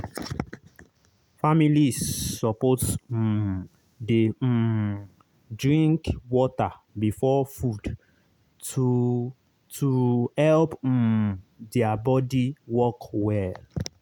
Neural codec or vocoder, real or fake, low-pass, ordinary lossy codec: none; real; 19.8 kHz; none